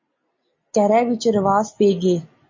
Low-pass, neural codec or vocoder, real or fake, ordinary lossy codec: 7.2 kHz; none; real; MP3, 32 kbps